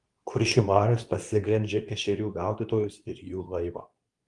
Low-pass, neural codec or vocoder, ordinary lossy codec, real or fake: 10.8 kHz; codec, 24 kHz, 0.9 kbps, WavTokenizer, medium speech release version 2; Opus, 32 kbps; fake